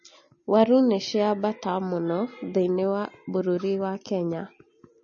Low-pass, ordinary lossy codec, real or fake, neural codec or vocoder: 7.2 kHz; MP3, 32 kbps; real; none